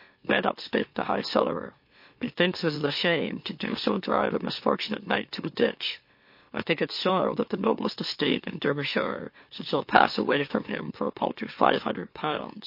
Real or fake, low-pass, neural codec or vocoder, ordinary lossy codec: fake; 5.4 kHz; autoencoder, 44.1 kHz, a latent of 192 numbers a frame, MeloTTS; MP3, 32 kbps